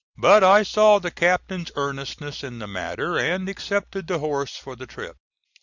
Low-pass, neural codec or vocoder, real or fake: 7.2 kHz; none; real